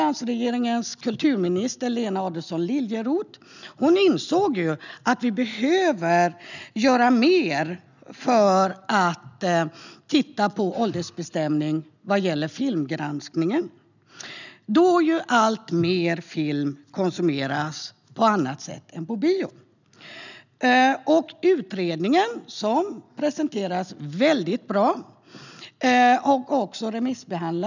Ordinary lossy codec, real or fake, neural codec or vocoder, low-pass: none; real; none; 7.2 kHz